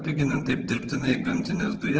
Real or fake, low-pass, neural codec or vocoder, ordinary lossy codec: fake; 7.2 kHz; vocoder, 22.05 kHz, 80 mel bands, HiFi-GAN; Opus, 16 kbps